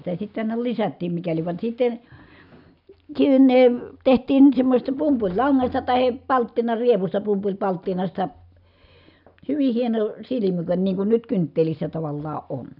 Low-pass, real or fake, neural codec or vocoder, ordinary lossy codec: 5.4 kHz; real; none; none